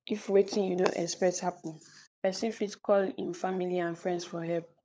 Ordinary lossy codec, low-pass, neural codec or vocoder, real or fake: none; none; codec, 16 kHz, 16 kbps, FunCodec, trained on LibriTTS, 50 frames a second; fake